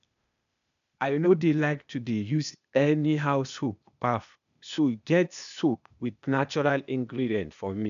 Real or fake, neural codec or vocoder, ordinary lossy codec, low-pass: fake; codec, 16 kHz, 0.8 kbps, ZipCodec; none; 7.2 kHz